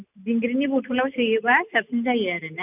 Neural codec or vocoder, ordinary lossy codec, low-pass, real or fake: none; none; 3.6 kHz; real